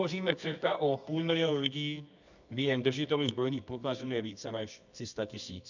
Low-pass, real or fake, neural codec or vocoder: 7.2 kHz; fake; codec, 24 kHz, 0.9 kbps, WavTokenizer, medium music audio release